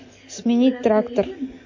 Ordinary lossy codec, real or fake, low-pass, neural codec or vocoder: MP3, 32 kbps; real; 7.2 kHz; none